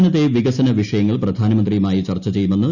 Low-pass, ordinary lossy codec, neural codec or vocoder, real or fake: 7.2 kHz; none; none; real